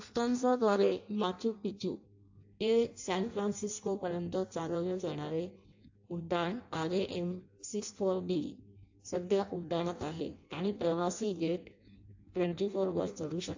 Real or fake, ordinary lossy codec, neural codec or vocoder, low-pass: fake; AAC, 48 kbps; codec, 16 kHz in and 24 kHz out, 0.6 kbps, FireRedTTS-2 codec; 7.2 kHz